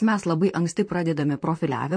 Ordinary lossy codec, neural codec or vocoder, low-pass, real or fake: MP3, 48 kbps; vocoder, 44.1 kHz, 128 mel bands, Pupu-Vocoder; 9.9 kHz; fake